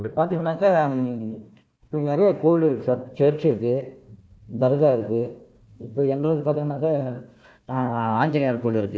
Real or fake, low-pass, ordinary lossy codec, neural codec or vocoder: fake; none; none; codec, 16 kHz, 1 kbps, FunCodec, trained on Chinese and English, 50 frames a second